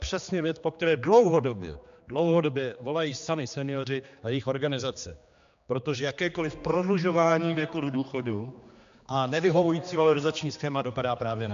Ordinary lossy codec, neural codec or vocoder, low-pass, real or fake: MP3, 64 kbps; codec, 16 kHz, 2 kbps, X-Codec, HuBERT features, trained on general audio; 7.2 kHz; fake